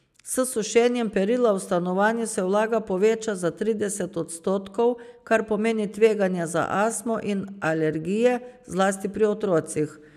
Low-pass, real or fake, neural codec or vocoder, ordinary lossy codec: 14.4 kHz; real; none; none